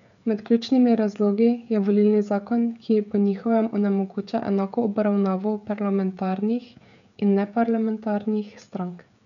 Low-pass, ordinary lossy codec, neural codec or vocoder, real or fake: 7.2 kHz; none; codec, 16 kHz, 16 kbps, FreqCodec, smaller model; fake